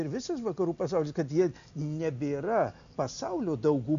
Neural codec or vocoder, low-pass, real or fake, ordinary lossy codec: none; 7.2 kHz; real; AAC, 96 kbps